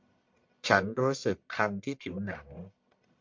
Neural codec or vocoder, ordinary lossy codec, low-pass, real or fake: codec, 44.1 kHz, 1.7 kbps, Pupu-Codec; MP3, 64 kbps; 7.2 kHz; fake